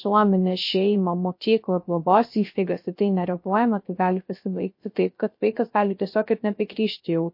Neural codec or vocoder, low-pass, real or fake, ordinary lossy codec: codec, 16 kHz, 0.3 kbps, FocalCodec; 5.4 kHz; fake; MP3, 32 kbps